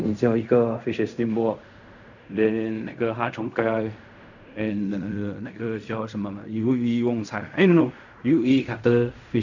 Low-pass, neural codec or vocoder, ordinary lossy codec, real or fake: 7.2 kHz; codec, 16 kHz in and 24 kHz out, 0.4 kbps, LongCat-Audio-Codec, fine tuned four codebook decoder; none; fake